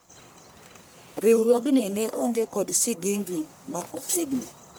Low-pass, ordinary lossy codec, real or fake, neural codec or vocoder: none; none; fake; codec, 44.1 kHz, 1.7 kbps, Pupu-Codec